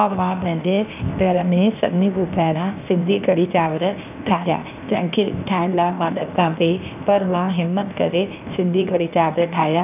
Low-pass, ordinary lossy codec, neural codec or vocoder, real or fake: 3.6 kHz; none; codec, 16 kHz, 0.8 kbps, ZipCodec; fake